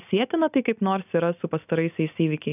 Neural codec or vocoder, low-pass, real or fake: none; 3.6 kHz; real